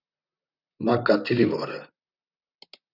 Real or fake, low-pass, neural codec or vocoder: fake; 5.4 kHz; vocoder, 44.1 kHz, 128 mel bands, Pupu-Vocoder